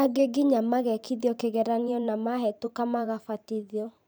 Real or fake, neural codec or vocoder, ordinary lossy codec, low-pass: fake; vocoder, 44.1 kHz, 128 mel bands every 512 samples, BigVGAN v2; none; none